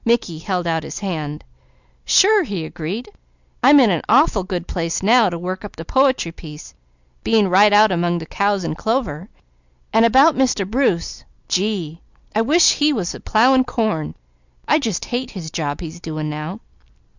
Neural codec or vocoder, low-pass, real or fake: none; 7.2 kHz; real